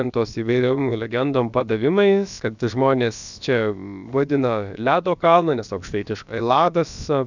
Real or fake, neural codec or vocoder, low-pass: fake; codec, 16 kHz, about 1 kbps, DyCAST, with the encoder's durations; 7.2 kHz